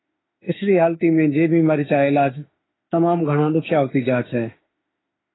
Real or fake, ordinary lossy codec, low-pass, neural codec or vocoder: fake; AAC, 16 kbps; 7.2 kHz; autoencoder, 48 kHz, 32 numbers a frame, DAC-VAE, trained on Japanese speech